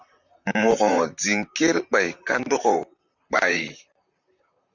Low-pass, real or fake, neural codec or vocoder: 7.2 kHz; fake; vocoder, 44.1 kHz, 128 mel bands, Pupu-Vocoder